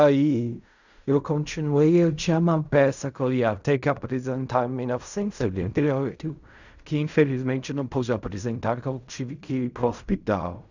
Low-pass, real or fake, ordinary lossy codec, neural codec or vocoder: 7.2 kHz; fake; none; codec, 16 kHz in and 24 kHz out, 0.4 kbps, LongCat-Audio-Codec, fine tuned four codebook decoder